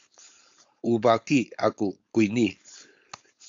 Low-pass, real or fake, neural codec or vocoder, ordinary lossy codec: 7.2 kHz; fake; codec, 16 kHz, 4.8 kbps, FACodec; AAC, 64 kbps